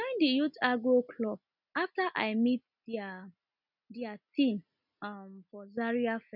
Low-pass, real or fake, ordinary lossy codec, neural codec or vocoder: 5.4 kHz; real; none; none